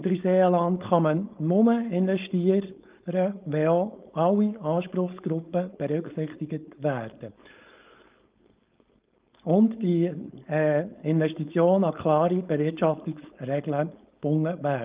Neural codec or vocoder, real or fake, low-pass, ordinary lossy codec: codec, 16 kHz, 4.8 kbps, FACodec; fake; 3.6 kHz; Opus, 32 kbps